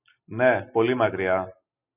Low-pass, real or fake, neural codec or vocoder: 3.6 kHz; real; none